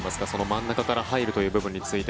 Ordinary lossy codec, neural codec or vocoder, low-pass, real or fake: none; none; none; real